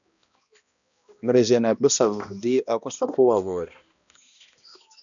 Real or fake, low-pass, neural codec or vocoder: fake; 7.2 kHz; codec, 16 kHz, 1 kbps, X-Codec, HuBERT features, trained on balanced general audio